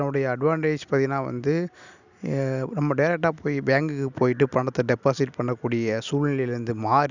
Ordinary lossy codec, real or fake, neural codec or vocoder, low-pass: none; real; none; 7.2 kHz